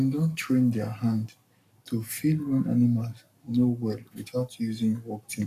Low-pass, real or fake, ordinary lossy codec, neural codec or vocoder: 14.4 kHz; fake; none; codec, 44.1 kHz, 7.8 kbps, Pupu-Codec